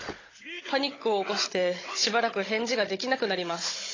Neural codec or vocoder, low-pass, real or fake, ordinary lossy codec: codec, 16 kHz, 16 kbps, FunCodec, trained on Chinese and English, 50 frames a second; 7.2 kHz; fake; AAC, 32 kbps